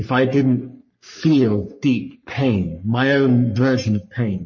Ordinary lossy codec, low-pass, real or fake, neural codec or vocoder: MP3, 32 kbps; 7.2 kHz; fake; codec, 44.1 kHz, 3.4 kbps, Pupu-Codec